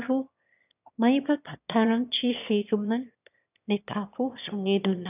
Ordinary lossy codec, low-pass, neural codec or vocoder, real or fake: none; 3.6 kHz; autoencoder, 22.05 kHz, a latent of 192 numbers a frame, VITS, trained on one speaker; fake